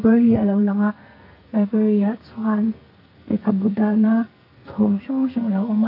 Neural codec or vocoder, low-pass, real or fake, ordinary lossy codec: codec, 44.1 kHz, 2.6 kbps, SNAC; 5.4 kHz; fake; none